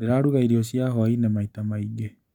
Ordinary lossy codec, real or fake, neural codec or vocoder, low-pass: none; real; none; 19.8 kHz